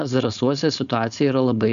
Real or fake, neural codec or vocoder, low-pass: fake; codec, 16 kHz, 4.8 kbps, FACodec; 7.2 kHz